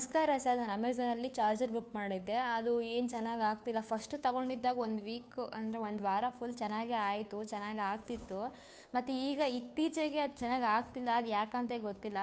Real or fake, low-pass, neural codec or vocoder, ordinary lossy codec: fake; none; codec, 16 kHz, 2 kbps, FunCodec, trained on Chinese and English, 25 frames a second; none